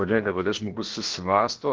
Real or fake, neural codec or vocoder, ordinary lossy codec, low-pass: fake; codec, 16 kHz, about 1 kbps, DyCAST, with the encoder's durations; Opus, 16 kbps; 7.2 kHz